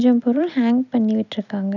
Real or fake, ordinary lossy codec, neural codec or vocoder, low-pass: real; none; none; 7.2 kHz